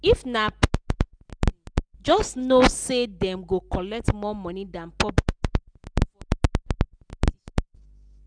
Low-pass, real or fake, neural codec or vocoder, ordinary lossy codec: 9.9 kHz; real; none; none